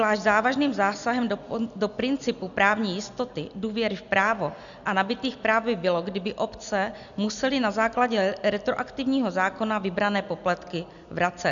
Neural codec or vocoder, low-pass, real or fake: none; 7.2 kHz; real